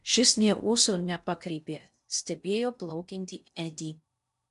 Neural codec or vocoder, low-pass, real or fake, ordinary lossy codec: codec, 16 kHz in and 24 kHz out, 0.6 kbps, FocalCodec, streaming, 2048 codes; 10.8 kHz; fake; MP3, 96 kbps